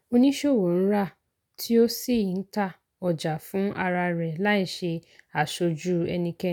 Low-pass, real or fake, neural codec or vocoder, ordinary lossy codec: 19.8 kHz; real; none; none